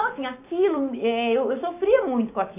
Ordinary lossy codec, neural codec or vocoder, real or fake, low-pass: MP3, 24 kbps; codec, 16 kHz, 6 kbps, DAC; fake; 3.6 kHz